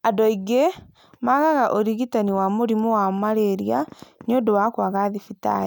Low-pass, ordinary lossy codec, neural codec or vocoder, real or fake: none; none; none; real